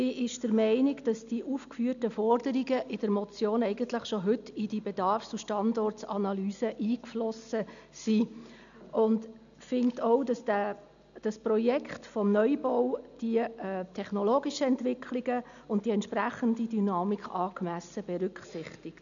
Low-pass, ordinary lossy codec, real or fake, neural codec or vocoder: 7.2 kHz; none; real; none